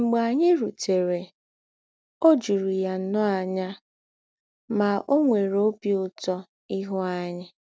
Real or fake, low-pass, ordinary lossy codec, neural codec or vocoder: real; none; none; none